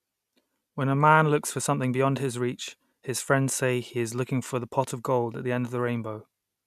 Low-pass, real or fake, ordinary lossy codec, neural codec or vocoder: 14.4 kHz; real; none; none